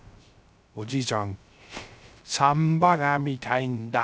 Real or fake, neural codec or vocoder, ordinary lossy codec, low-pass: fake; codec, 16 kHz, 0.3 kbps, FocalCodec; none; none